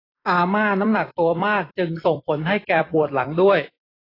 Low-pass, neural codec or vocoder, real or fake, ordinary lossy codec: 5.4 kHz; vocoder, 44.1 kHz, 128 mel bands every 256 samples, BigVGAN v2; fake; AAC, 24 kbps